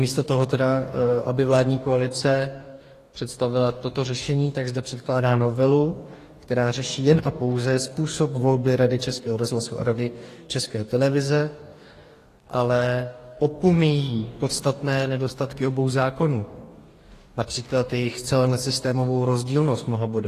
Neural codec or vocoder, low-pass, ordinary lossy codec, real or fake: codec, 44.1 kHz, 2.6 kbps, DAC; 14.4 kHz; AAC, 48 kbps; fake